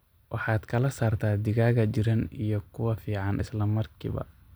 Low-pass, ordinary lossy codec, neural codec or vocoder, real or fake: none; none; none; real